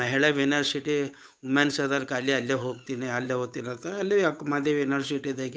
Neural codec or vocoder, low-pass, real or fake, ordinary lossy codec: codec, 16 kHz, 8 kbps, FunCodec, trained on Chinese and English, 25 frames a second; none; fake; none